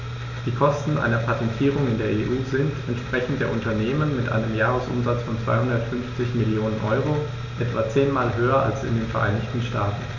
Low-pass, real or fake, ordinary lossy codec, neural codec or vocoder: 7.2 kHz; real; none; none